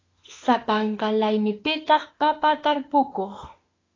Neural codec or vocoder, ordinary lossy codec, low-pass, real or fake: autoencoder, 48 kHz, 32 numbers a frame, DAC-VAE, trained on Japanese speech; AAC, 32 kbps; 7.2 kHz; fake